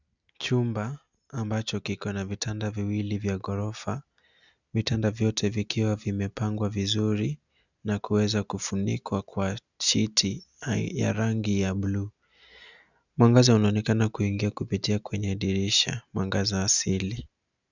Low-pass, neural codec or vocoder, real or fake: 7.2 kHz; none; real